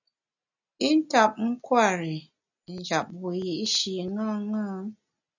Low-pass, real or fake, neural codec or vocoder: 7.2 kHz; real; none